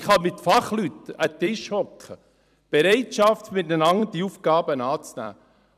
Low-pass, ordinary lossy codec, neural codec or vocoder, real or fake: 14.4 kHz; none; vocoder, 44.1 kHz, 128 mel bands every 256 samples, BigVGAN v2; fake